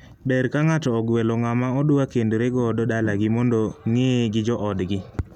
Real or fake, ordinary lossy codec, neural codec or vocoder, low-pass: real; none; none; 19.8 kHz